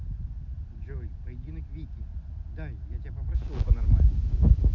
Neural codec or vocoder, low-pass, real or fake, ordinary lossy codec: none; 7.2 kHz; real; none